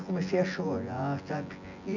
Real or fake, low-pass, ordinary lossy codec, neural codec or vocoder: fake; 7.2 kHz; none; vocoder, 24 kHz, 100 mel bands, Vocos